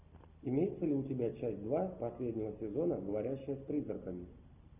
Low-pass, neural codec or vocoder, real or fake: 3.6 kHz; none; real